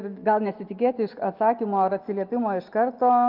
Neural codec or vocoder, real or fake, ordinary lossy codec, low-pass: none; real; Opus, 32 kbps; 5.4 kHz